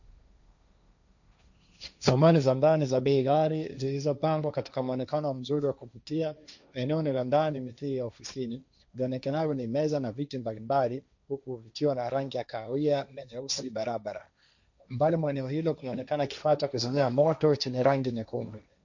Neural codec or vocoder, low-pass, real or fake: codec, 16 kHz, 1.1 kbps, Voila-Tokenizer; 7.2 kHz; fake